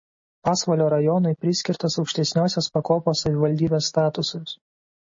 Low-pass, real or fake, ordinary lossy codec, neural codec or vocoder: 7.2 kHz; real; MP3, 32 kbps; none